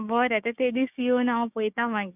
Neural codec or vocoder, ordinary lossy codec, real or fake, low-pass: codec, 16 kHz, 6 kbps, DAC; none; fake; 3.6 kHz